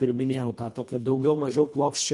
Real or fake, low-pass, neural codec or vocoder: fake; 10.8 kHz; codec, 24 kHz, 1.5 kbps, HILCodec